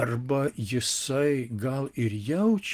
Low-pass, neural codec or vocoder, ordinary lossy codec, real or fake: 14.4 kHz; none; Opus, 24 kbps; real